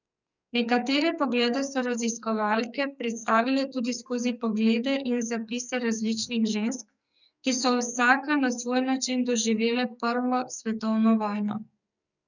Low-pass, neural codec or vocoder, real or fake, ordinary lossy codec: 7.2 kHz; codec, 44.1 kHz, 2.6 kbps, SNAC; fake; none